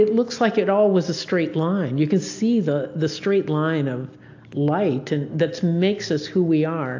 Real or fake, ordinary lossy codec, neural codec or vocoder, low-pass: real; AAC, 48 kbps; none; 7.2 kHz